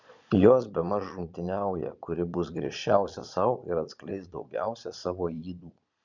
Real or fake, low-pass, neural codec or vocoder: fake; 7.2 kHz; vocoder, 22.05 kHz, 80 mel bands, Vocos